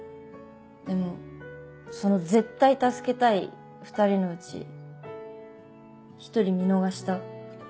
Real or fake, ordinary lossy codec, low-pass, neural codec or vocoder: real; none; none; none